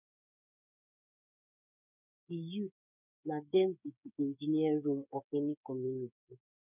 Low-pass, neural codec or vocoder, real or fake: 3.6 kHz; codec, 16 kHz, 8 kbps, FreqCodec, smaller model; fake